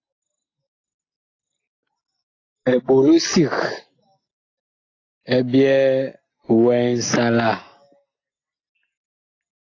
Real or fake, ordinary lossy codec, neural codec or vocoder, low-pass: real; AAC, 32 kbps; none; 7.2 kHz